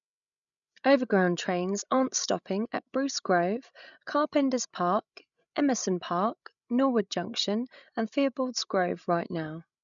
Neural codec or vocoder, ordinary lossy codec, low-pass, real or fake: codec, 16 kHz, 16 kbps, FreqCodec, larger model; none; 7.2 kHz; fake